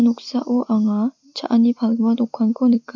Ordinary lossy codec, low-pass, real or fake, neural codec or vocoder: MP3, 48 kbps; 7.2 kHz; real; none